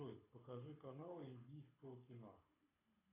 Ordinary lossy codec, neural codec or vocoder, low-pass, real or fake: AAC, 24 kbps; codec, 24 kHz, 6 kbps, HILCodec; 3.6 kHz; fake